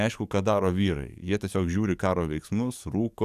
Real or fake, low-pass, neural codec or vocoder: fake; 14.4 kHz; codec, 44.1 kHz, 7.8 kbps, DAC